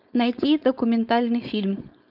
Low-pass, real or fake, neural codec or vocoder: 5.4 kHz; fake; codec, 16 kHz, 4.8 kbps, FACodec